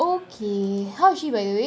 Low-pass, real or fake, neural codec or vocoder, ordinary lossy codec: none; real; none; none